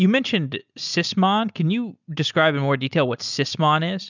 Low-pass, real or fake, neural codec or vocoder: 7.2 kHz; real; none